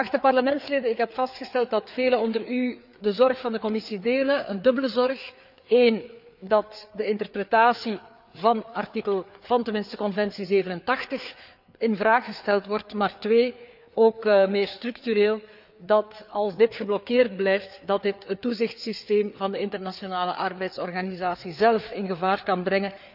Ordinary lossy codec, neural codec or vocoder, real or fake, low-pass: none; codec, 16 kHz, 4 kbps, FreqCodec, larger model; fake; 5.4 kHz